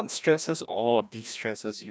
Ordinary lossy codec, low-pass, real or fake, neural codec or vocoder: none; none; fake; codec, 16 kHz, 1 kbps, FreqCodec, larger model